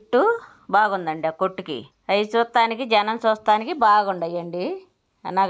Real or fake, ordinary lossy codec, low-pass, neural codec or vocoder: real; none; none; none